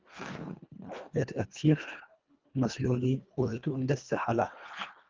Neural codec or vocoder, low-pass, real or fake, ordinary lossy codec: codec, 24 kHz, 1.5 kbps, HILCodec; 7.2 kHz; fake; Opus, 32 kbps